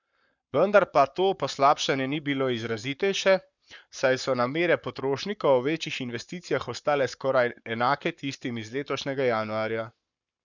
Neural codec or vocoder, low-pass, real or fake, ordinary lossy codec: codec, 44.1 kHz, 7.8 kbps, Pupu-Codec; 7.2 kHz; fake; none